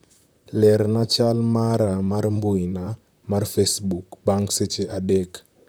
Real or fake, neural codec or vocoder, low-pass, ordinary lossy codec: fake; vocoder, 44.1 kHz, 128 mel bands, Pupu-Vocoder; none; none